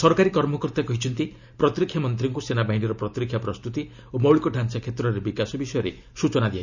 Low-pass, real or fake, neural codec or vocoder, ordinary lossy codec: 7.2 kHz; real; none; none